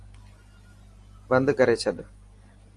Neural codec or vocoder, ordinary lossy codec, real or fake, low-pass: none; Opus, 32 kbps; real; 10.8 kHz